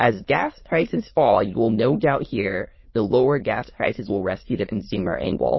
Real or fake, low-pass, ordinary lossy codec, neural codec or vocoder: fake; 7.2 kHz; MP3, 24 kbps; autoencoder, 22.05 kHz, a latent of 192 numbers a frame, VITS, trained on many speakers